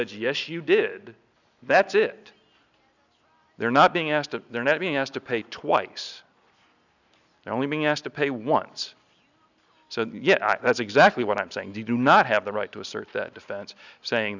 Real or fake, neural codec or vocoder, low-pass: real; none; 7.2 kHz